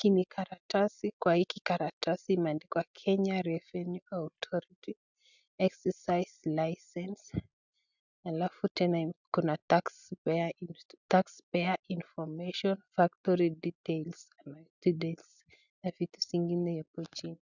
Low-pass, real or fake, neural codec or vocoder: 7.2 kHz; real; none